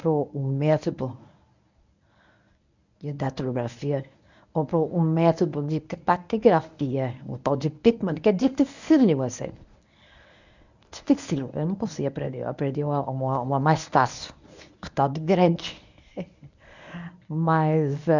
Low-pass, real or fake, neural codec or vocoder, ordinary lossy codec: 7.2 kHz; fake; codec, 24 kHz, 0.9 kbps, WavTokenizer, medium speech release version 1; none